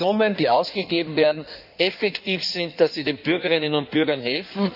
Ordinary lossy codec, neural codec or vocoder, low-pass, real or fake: none; codec, 16 kHz in and 24 kHz out, 1.1 kbps, FireRedTTS-2 codec; 5.4 kHz; fake